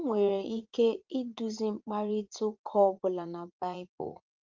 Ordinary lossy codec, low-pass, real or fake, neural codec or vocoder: Opus, 24 kbps; 7.2 kHz; fake; vocoder, 44.1 kHz, 80 mel bands, Vocos